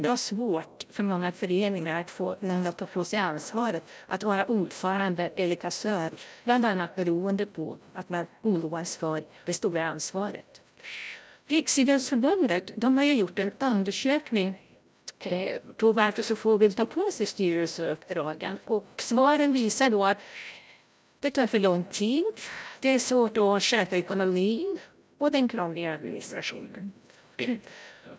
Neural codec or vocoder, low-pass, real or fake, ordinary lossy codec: codec, 16 kHz, 0.5 kbps, FreqCodec, larger model; none; fake; none